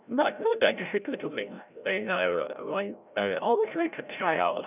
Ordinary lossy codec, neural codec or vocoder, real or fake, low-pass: none; codec, 16 kHz, 0.5 kbps, FreqCodec, larger model; fake; 3.6 kHz